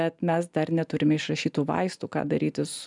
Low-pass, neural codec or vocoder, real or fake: 10.8 kHz; none; real